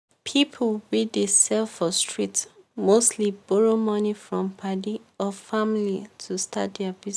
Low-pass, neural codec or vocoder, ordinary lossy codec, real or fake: none; none; none; real